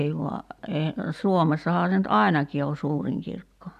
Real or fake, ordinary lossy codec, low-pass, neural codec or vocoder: real; none; 14.4 kHz; none